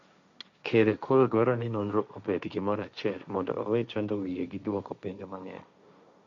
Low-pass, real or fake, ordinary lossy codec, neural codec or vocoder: 7.2 kHz; fake; none; codec, 16 kHz, 1.1 kbps, Voila-Tokenizer